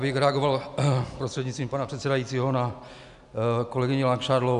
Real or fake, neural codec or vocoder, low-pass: real; none; 10.8 kHz